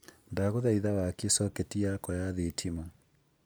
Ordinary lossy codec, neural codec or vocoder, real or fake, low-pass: none; none; real; none